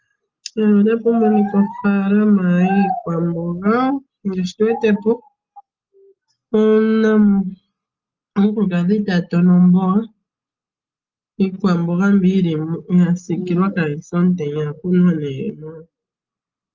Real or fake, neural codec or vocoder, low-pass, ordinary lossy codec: real; none; 7.2 kHz; Opus, 24 kbps